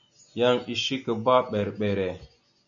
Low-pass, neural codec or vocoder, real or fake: 7.2 kHz; none; real